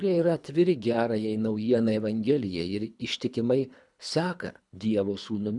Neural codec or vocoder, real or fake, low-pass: codec, 24 kHz, 3 kbps, HILCodec; fake; 10.8 kHz